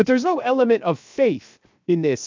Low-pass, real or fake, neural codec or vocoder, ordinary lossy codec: 7.2 kHz; fake; codec, 16 kHz, 0.7 kbps, FocalCodec; MP3, 64 kbps